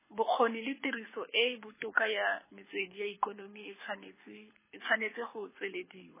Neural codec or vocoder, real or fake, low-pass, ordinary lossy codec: codec, 24 kHz, 6 kbps, HILCodec; fake; 3.6 kHz; MP3, 16 kbps